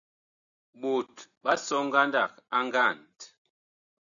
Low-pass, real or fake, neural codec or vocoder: 7.2 kHz; real; none